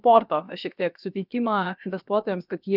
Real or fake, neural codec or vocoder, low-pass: fake; codec, 16 kHz, about 1 kbps, DyCAST, with the encoder's durations; 5.4 kHz